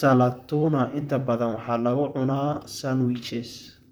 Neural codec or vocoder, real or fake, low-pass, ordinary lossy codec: vocoder, 44.1 kHz, 128 mel bands, Pupu-Vocoder; fake; none; none